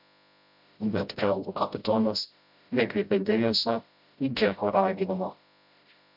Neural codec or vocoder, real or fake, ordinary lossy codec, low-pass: codec, 16 kHz, 0.5 kbps, FreqCodec, smaller model; fake; MP3, 48 kbps; 5.4 kHz